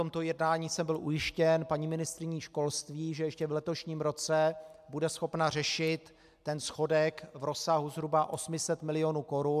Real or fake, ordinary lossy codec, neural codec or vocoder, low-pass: real; AAC, 96 kbps; none; 14.4 kHz